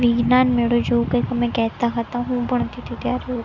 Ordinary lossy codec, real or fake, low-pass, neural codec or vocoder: none; real; 7.2 kHz; none